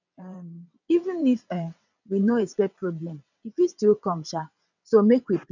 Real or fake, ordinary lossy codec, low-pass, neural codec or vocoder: fake; none; 7.2 kHz; vocoder, 22.05 kHz, 80 mel bands, WaveNeXt